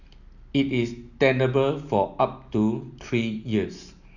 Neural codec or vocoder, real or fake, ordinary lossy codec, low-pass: none; real; none; 7.2 kHz